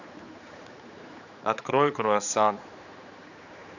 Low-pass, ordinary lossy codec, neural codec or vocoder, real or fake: 7.2 kHz; none; codec, 16 kHz, 4 kbps, X-Codec, HuBERT features, trained on general audio; fake